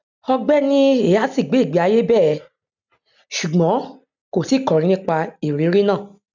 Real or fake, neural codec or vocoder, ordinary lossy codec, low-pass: real; none; none; 7.2 kHz